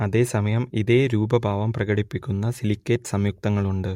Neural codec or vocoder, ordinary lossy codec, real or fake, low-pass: none; MP3, 64 kbps; real; 19.8 kHz